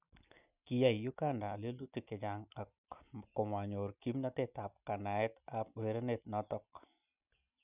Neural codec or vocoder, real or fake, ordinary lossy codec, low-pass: none; real; none; 3.6 kHz